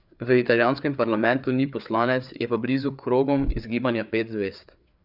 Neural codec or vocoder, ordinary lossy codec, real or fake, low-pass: codec, 16 kHz, 4 kbps, FreqCodec, larger model; none; fake; 5.4 kHz